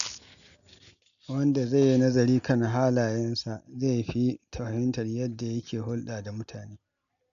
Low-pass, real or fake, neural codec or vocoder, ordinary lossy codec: 7.2 kHz; real; none; none